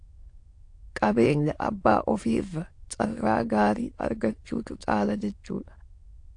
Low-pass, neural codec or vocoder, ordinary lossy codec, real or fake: 9.9 kHz; autoencoder, 22.05 kHz, a latent of 192 numbers a frame, VITS, trained on many speakers; AAC, 48 kbps; fake